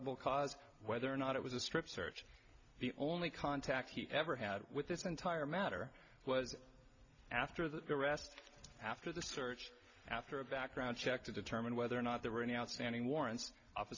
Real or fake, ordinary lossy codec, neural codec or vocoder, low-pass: real; MP3, 64 kbps; none; 7.2 kHz